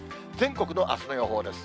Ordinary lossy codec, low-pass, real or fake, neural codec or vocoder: none; none; real; none